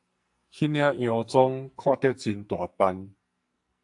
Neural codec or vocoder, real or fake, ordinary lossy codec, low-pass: codec, 44.1 kHz, 2.6 kbps, SNAC; fake; AAC, 64 kbps; 10.8 kHz